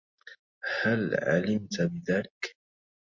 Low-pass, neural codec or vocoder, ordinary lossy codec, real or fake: 7.2 kHz; none; MP3, 48 kbps; real